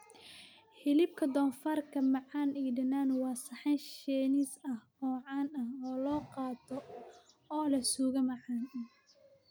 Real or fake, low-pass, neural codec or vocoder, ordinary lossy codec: real; none; none; none